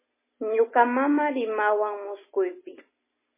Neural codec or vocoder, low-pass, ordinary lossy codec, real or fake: none; 3.6 kHz; MP3, 16 kbps; real